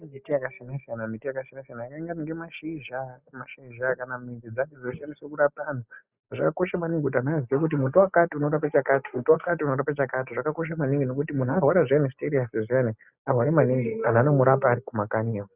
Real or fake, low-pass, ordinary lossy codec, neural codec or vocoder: real; 3.6 kHz; Opus, 64 kbps; none